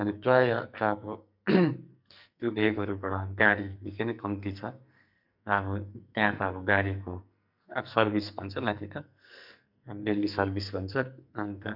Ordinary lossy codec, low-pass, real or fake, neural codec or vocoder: none; 5.4 kHz; fake; codec, 44.1 kHz, 2.6 kbps, SNAC